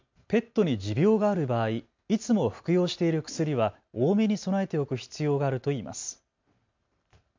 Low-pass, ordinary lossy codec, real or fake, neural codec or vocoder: 7.2 kHz; MP3, 64 kbps; real; none